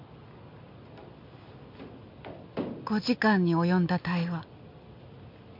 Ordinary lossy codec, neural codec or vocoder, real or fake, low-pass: MP3, 48 kbps; none; real; 5.4 kHz